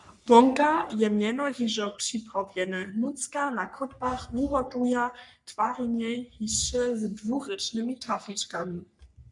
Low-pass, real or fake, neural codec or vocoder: 10.8 kHz; fake; codec, 44.1 kHz, 3.4 kbps, Pupu-Codec